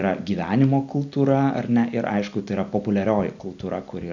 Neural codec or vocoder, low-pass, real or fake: none; 7.2 kHz; real